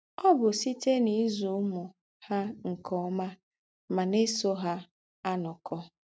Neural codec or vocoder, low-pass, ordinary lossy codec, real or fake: none; none; none; real